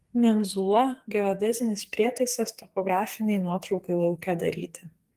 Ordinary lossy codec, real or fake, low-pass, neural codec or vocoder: Opus, 24 kbps; fake; 14.4 kHz; codec, 44.1 kHz, 2.6 kbps, SNAC